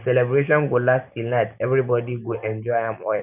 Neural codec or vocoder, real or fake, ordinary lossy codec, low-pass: none; real; none; 3.6 kHz